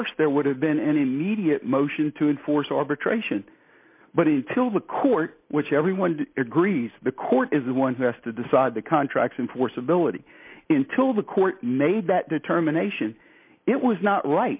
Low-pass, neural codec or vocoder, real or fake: 3.6 kHz; none; real